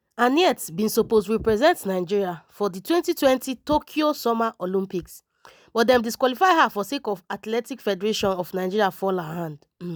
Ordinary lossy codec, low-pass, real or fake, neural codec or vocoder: none; none; real; none